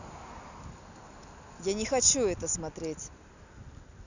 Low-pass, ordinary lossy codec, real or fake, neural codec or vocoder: 7.2 kHz; none; real; none